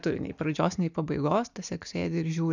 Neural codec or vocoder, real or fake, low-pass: none; real; 7.2 kHz